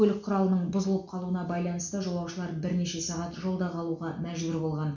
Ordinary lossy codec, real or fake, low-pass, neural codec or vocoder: none; real; 7.2 kHz; none